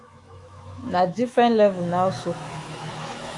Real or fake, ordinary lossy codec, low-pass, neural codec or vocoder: fake; AAC, 48 kbps; 10.8 kHz; autoencoder, 48 kHz, 128 numbers a frame, DAC-VAE, trained on Japanese speech